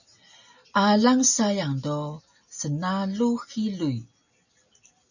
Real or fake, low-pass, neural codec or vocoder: real; 7.2 kHz; none